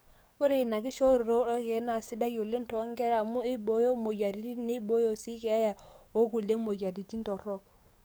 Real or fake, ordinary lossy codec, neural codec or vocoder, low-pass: fake; none; codec, 44.1 kHz, 7.8 kbps, DAC; none